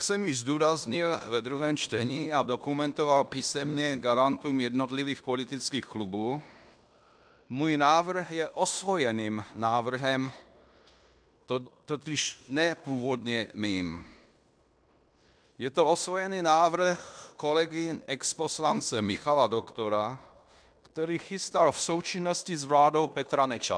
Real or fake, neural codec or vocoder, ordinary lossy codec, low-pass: fake; codec, 16 kHz in and 24 kHz out, 0.9 kbps, LongCat-Audio-Codec, fine tuned four codebook decoder; MP3, 96 kbps; 9.9 kHz